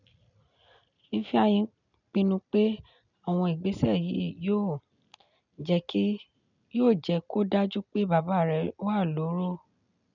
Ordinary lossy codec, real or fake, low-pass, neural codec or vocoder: none; real; 7.2 kHz; none